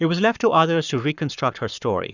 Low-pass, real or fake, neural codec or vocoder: 7.2 kHz; fake; codec, 44.1 kHz, 7.8 kbps, Pupu-Codec